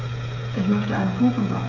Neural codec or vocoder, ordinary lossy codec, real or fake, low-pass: codec, 16 kHz, 16 kbps, FreqCodec, smaller model; none; fake; 7.2 kHz